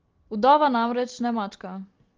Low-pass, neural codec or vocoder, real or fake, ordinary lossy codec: 7.2 kHz; none; real; Opus, 16 kbps